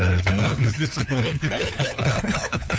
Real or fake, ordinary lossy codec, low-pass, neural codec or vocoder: fake; none; none; codec, 16 kHz, 4 kbps, FunCodec, trained on LibriTTS, 50 frames a second